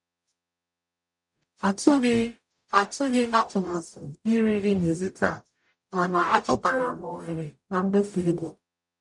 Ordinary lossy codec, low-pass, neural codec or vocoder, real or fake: none; 10.8 kHz; codec, 44.1 kHz, 0.9 kbps, DAC; fake